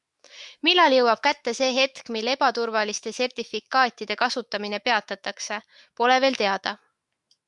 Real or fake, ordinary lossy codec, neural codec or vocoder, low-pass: fake; Opus, 64 kbps; autoencoder, 48 kHz, 128 numbers a frame, DAC-VAE, trained on Japanese speech; 10.8 kHz